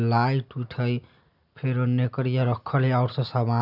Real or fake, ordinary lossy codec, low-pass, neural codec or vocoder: real; none; 5.4 kHz; none